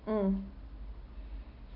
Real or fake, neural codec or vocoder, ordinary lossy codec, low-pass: real; none; none; 5.4 kHz